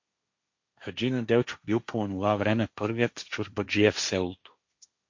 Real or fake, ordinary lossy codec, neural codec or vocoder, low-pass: fake; MP3, 48 kbps; codec, 16 kHz, 1.1 kbps, Voila-Tokenizer; 7.2 kHz